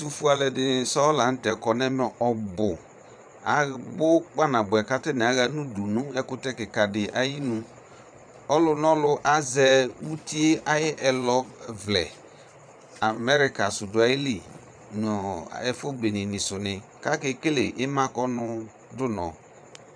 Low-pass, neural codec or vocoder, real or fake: 9.9 kHz; vocoder, 22.05 kHz, 80 mel bands, Vocos; fake